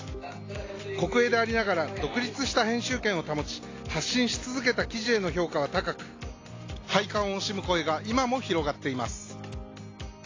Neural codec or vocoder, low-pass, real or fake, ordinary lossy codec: none; 7.2 kHz; real; AAC, 32 kbps